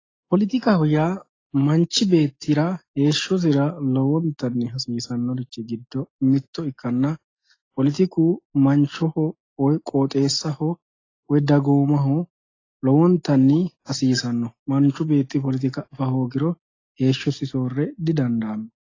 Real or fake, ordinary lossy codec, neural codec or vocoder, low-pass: real; AAC, 32 kbps; none; 7.2 kHz